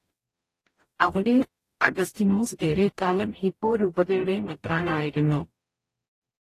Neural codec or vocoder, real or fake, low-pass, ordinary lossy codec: codec, 44.1 kHz, 0.9 kbps, DAC; fake; 14.4 kHz; AAC, 48 kbps